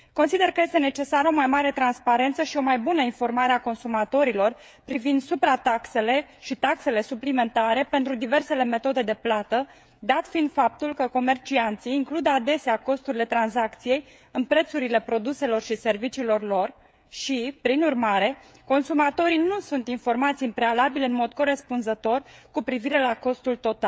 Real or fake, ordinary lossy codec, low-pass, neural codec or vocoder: fake; none; none; codec, 16 kHz, 16 kbps, FreqCodec, smaller model